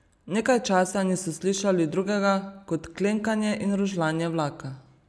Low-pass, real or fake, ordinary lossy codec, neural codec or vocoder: none; real; none; none